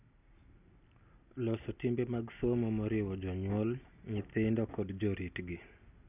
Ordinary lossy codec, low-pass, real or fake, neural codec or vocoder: none; 3.6 kHz; real; none